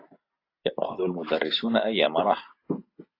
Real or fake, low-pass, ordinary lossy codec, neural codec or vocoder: real; 5.4 kHz; AAC, 32 kbps; none